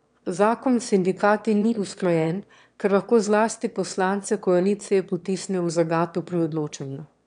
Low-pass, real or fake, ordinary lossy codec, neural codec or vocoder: 9.9 kHz; fake; none; autoencoder, 22.05 kHz, a latent of 192 numbers a frame, VITS, trained on one speaker